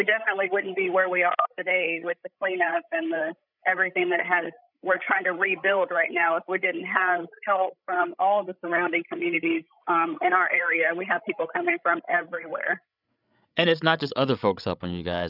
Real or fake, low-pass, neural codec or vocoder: fake; 5.4 kHz; codec, 16 kHz, 16 kbps, FreqCodec, larger model